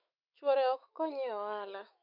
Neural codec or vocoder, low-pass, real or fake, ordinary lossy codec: autoencoder, 48 kHz, 128 numbers a frame, DAC-VAE, trained on Japanese speech; 5.4 kHz; fake; MP3, 48 kbps